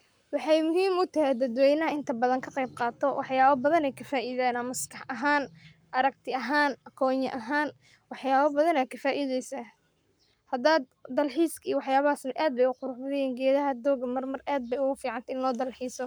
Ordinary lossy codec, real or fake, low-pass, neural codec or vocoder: none; fake; none; codec, 44.1 kHz, 7.8 kbps, Pupu-Codec